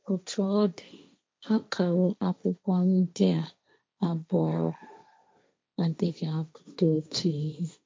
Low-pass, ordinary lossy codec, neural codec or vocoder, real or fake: none; none; codec, 16 kHz, 1.1 kbps, Voila-Tokenizer; fake